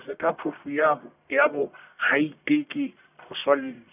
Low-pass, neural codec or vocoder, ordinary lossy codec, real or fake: 3.6 kHz; codec, 44.1 kHz, 1.7 kbps, Pupu-Codec; none; fake